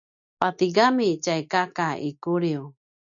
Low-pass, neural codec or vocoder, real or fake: 7.2 kHz; none; real